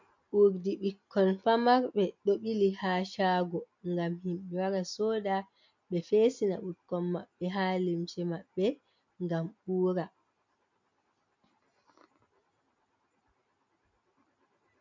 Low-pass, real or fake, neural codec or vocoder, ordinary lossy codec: 7.2 kHz; real; none; MP3, 64 kbps